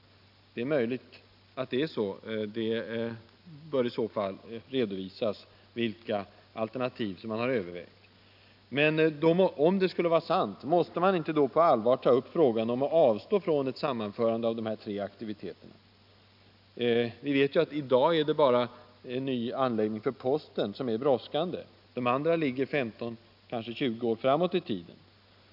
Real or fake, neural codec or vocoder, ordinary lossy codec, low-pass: real; none; none; 5.4 kHz